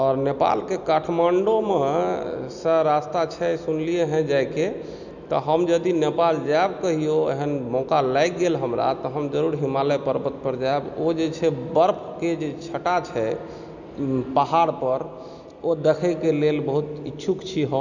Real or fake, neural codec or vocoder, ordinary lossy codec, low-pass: real; none; none; 7.2 kHz